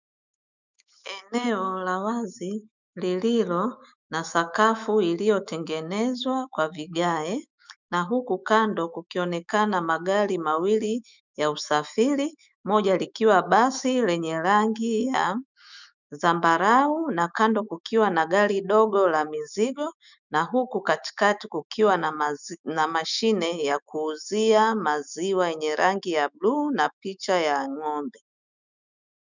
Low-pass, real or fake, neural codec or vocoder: 7.2 kHz; fake; autoencoder, 48 kHz, 128 numbers a frame, DAC-VAE, trained on Japanese speech